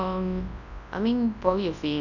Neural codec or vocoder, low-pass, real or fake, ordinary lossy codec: codec, 24 kHz, 0.9 kbps, WavTokenizer, large speech release; 7.2 kHz; fake; none